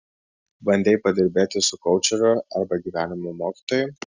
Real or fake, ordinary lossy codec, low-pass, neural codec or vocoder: real; Opus, 64 kbps; 7.2 kHz; none